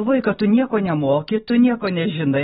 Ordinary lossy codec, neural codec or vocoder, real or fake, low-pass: AAC, 16 kbps; none; real; 19.8 kHz